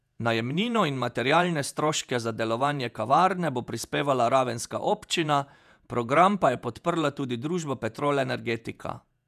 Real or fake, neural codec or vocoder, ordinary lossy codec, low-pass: fake; vocoder, 48 kHz, 128 mel bands, Vocos; none; 14.4 kHz